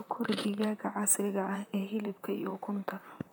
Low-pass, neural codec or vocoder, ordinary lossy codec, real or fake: none; vocoder, 44.1 kHz, 128 mel bands, Pupu-Vocoder; none; fake